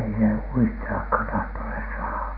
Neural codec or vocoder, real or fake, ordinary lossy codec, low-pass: none; real; none; 5.4 kHz